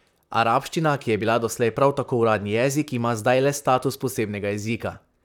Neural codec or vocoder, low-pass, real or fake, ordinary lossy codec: vocoder, 44.1 kHz, 128 mel bands, Pupu-Vocoder; 19.8 kHz; fake; none